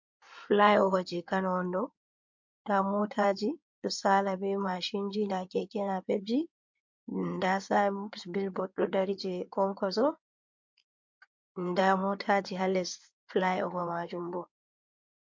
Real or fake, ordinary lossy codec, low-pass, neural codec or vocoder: fake; MP3, 48 kbps; 7.2 kHz; codec, 16 kHz in and 24 kHz out, 2.2 kbps, FireRedTTS-2 codec